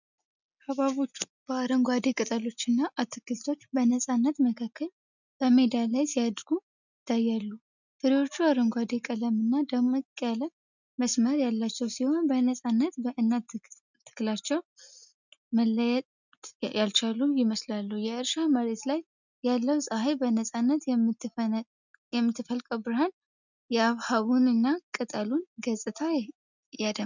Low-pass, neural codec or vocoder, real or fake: 7.2 kHz; none; real